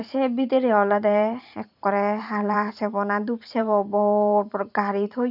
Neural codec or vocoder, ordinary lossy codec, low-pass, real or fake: vocoder, 44.1 kHz, 128 mel bands every 512 samples, BigVGAN v2; none; 5.4 kHz; fake